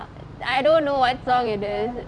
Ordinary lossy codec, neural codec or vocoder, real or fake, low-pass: none; vocoder, 44.1 kHz, 128 mel bands every 512 samples, BigVGAN v2; fake; 9.9 kHz